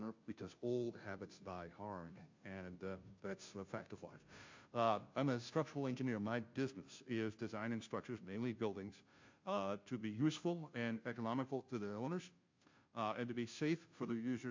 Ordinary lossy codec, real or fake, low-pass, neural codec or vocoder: MP3, 48 kbps; fake; 7.2 kHz; codec, 16 kHz, 0.5 kbps, FunCodec, trained on Chinese and English, 25 frames a second